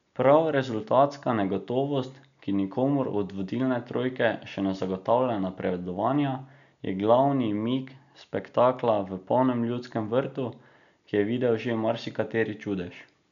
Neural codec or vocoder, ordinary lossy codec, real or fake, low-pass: none; none; real; 7.2 kHz